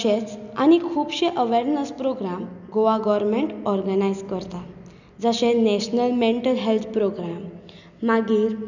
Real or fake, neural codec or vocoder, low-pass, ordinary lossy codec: real; none; 7.2 kHz; none